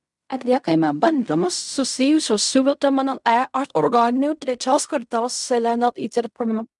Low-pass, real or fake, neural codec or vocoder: 10.8 kHz; fake; codec, 16 kHz in and 24 kHz out, 0.4 kbps, LongCat-Audio-Codec, fine tuned four codebook decoder